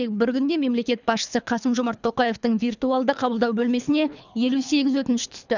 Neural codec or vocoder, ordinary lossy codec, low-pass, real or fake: codec, 24 kHz, 3 kbps, HILCodec; none; 7.2 kHz; fake